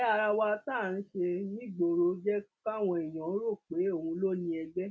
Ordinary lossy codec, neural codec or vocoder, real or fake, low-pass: none; none; real; none